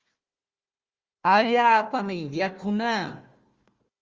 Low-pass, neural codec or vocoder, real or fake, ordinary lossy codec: 7.2 kHz; codec, 16 kHz, 1 kbps, FunCodec, trained on Chinese and English, 50 frames a second; fake; Opus, 32 kbps